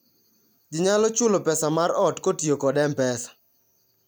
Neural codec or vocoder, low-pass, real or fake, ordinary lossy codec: none; none; real; none